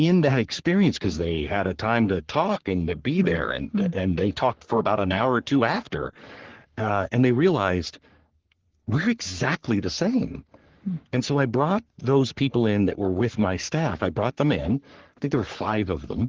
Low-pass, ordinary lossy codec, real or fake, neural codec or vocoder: 7.2 kHz; Opus, 16 kbps; fake; codec, 44.1 kHz, 3.4 kbps, Pupu-Codec